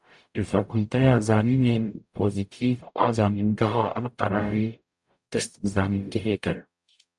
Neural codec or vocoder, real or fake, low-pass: codec, 44.1 kHz, 0.9 kbps, DAC; fake; 10.8 kHz